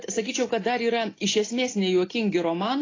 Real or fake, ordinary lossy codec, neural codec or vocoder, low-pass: real; AAC, 32 kbps; none; 7.2 kHz